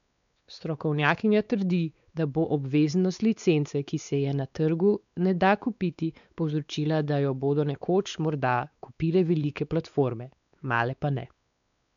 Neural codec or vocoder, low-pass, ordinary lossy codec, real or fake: codec, 16 kHz, 4 kbps, X-Codec, WavLM features, trained on Multilingual LibriSpeech; 7.2 kHz; MP3, 96 kbps; fake